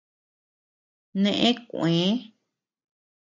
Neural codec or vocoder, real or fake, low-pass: none; real; 7.2 kHz